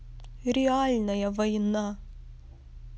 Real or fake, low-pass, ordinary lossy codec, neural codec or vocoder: real; none; none; none